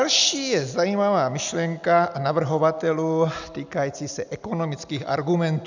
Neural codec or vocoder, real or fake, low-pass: none; real; 7.2 kHz